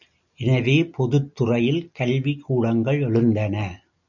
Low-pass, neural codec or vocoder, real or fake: 7.2 kHz; none; real